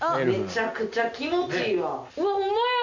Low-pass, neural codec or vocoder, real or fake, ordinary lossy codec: 7.2 kHz; none; real; none